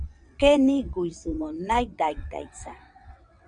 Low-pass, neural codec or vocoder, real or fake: 9.9 kHz; vocoder, 22.05 kHz, 80 mel bands, WaveNeXt; fake